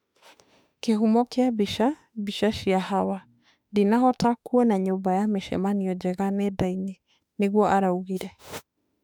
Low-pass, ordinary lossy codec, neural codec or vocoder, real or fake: 19.8 kHz; none; autoencoder, 48 kHz, 32 numbers a frame, DAC-VAE, trained on Japanese speech; fake